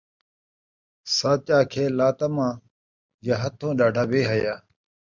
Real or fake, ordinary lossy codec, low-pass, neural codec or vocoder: real; MP3, 64 kbps; 7.2 kHz; none